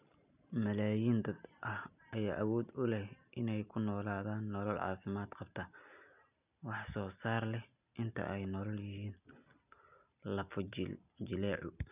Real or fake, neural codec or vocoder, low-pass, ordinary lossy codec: real; none; 3.6 kHz; none